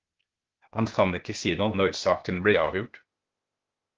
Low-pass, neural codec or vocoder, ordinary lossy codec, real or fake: 7.2 kHz; codec, 16 kHz, 0.8 kbps, ZipCodec; Opus, 32 kbps; fake